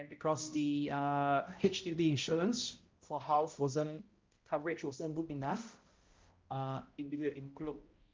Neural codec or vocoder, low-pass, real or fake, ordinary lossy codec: codec, 16 kHz, 0.5 kbps, X-Codec, HuBERT features, trained on balanced general audio; 7.2 kHz; fake; Opus, 24 kbps